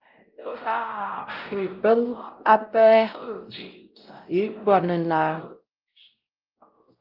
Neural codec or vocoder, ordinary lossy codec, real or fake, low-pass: codec, 16 kHz, 0.5 kbps, X-Codec, WavLM features, trained on Multilingual LibriSpeech; Opus, 32 kbps; fake; 5.4 kHz